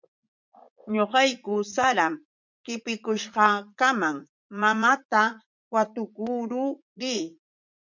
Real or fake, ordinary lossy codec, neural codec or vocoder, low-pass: fake; MP3, 64 kbps; vocoder, 44.1 kHz, 80 mel bands, Vocos; 7.2 kHz